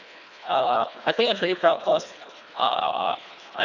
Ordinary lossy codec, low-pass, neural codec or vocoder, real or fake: none; 7.2 kHz; codec, 24 kHz, 1.5 kbps, HILCodec; fake